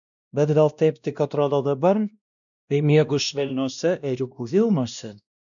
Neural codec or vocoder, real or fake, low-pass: codec, 16 kHz, 1 kbps, X-Codec, WavLM features, trained on Multilingual LibriSpeech; fake; 7.2 kHz